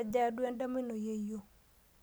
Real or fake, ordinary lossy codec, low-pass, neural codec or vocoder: real; none; none; none